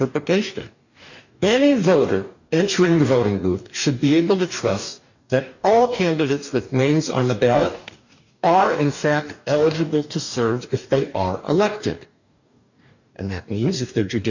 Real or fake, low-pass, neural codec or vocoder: fake; 7.2 kHz; codec, 44.1 kHz, 2.6 kbps, DAC